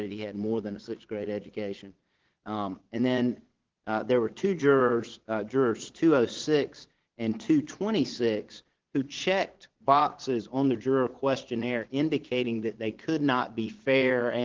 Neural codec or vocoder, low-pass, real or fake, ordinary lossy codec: vocoder, 22.05 kHz, 80 mel bands, Vocos; 7.2 kHz; fake; Opus, 16 kbps